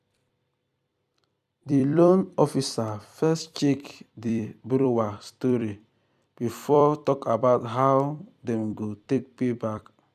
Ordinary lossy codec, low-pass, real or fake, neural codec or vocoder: none; 14.4 kHz; fake; vocoder, 44.1 kHz, 128 mel bands every 256 samples, BigVGAN v2